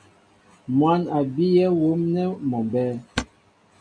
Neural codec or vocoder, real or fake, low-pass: none; real; 9.9 kHz